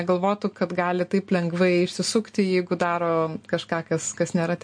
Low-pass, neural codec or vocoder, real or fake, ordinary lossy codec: 9.9 kHz; none; real; MP3, 48 kbps